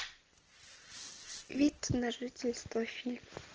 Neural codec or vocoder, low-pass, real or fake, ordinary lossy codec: none; 7.2 kHz; real; Opus, 16 kbps